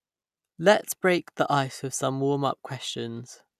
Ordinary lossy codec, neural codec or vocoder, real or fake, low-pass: none; none; real; 14.4 kHz